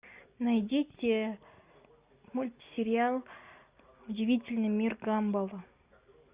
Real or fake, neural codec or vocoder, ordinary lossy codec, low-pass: real; none; Opus, 64 kbps; 3.6 kHz